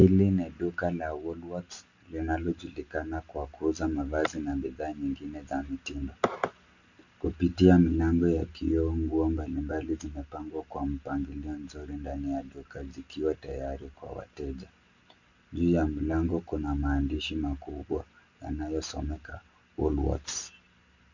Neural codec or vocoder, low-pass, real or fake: none; 7.2 kHz; real